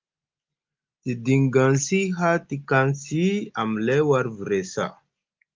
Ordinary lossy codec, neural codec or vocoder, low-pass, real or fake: Opus, 24 kbps; none; 7.2 kHz; real